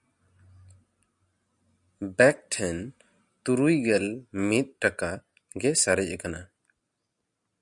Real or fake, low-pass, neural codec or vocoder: real; 10.8 kHz; none